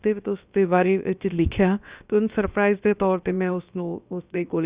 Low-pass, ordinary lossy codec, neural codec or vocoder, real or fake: 3.6 kHz; Opus, 64 kbps; codec, 16 kHz, about 1 kbps, DyCAST, with the encoder's durations; fake